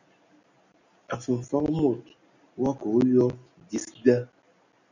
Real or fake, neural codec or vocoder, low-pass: real; none; 7.2 kHz